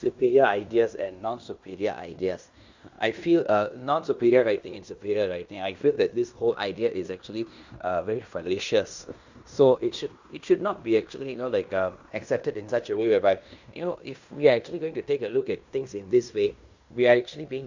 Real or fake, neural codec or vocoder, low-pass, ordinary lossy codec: fake; codec, 16 kHz in and 24 kHz out, 0.9 kbps, LongCat-Audio-Codec, fine tuned four codebook decoder; 7.2 kHz; Opus, 64 kbps